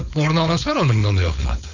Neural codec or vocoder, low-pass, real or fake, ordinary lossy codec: codec, 16 kHz, 4.8 kbps, FACodec; 7.2 kHz; fake; none